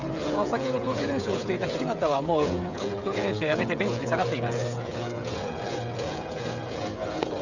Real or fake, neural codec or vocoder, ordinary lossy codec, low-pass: fake; codec, 16 kHz, 8 kbps, FreqCodec, smaller model; none; 7.2 kHz